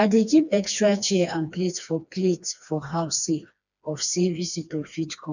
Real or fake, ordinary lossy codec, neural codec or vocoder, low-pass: fake; none; codec, 16 kHz, 2 kbps, FreqCodec, smaller model; 7.2 kHz